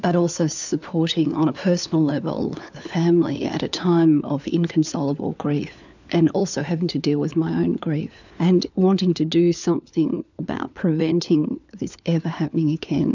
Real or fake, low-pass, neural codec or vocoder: fake; 7.2 kHz; codec, 16 kHz, 4 kbps, FunCodec, trained on LibriTTS, 50 frames a second